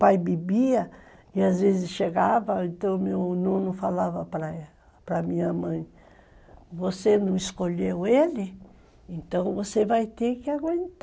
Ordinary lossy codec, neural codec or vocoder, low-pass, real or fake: none; none; none; real